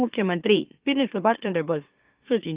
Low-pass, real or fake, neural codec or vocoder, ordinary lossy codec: 3.6 kHz; fake; autoencoder, 44.1 kHz, a latent of 192 numbers a frame, MeloTTS; Opus, 32 kbps